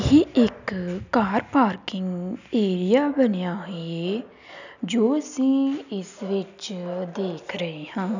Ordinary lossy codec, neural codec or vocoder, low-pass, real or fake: none; none; 7.2 kHz; real